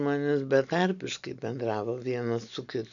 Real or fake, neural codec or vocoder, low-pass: real; none; 7.2 kHz